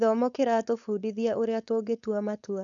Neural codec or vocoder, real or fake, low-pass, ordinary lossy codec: codec, 16 kHz, 4.8 kbps, FACodec; fake; 7.2 kHz; none